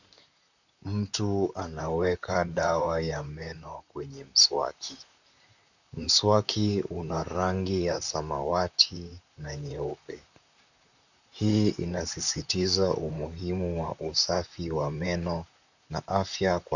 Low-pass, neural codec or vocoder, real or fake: 7.2 kHz; vocoder, 44.1 kHz, 128 mel bands, Pupu-Vocoder; fake